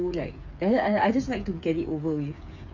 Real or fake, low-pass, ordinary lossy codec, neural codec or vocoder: fake; 7.2 kHz; none; codec, 16 kHz, 8 kbps, FreqCodec, smaller model